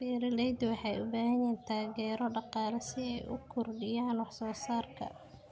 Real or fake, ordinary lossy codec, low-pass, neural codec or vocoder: real; none; none; none